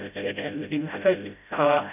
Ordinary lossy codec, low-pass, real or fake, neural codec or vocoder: none; 3.6 kHz; fake; codec, 16 kHz, 0.5 kbps, FreqCodec, smaller model